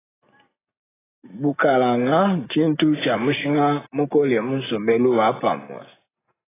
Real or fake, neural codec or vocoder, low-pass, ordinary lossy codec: real; none; 3.6 kHz; AAC, 16 kbps